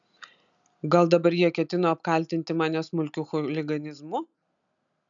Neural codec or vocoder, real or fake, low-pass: none; real; 7.2 kHz